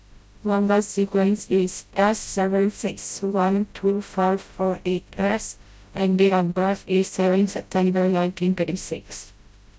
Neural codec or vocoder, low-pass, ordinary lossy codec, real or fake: codec, 16 kHz, 0.5 kbps, FreqCodec, smaller model; none; none; fake